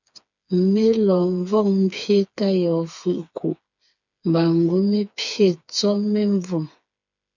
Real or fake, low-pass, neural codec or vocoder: fake; 7.2 kHz; codec, 16 kHz, 4 kbps, FreqCodec, smaller model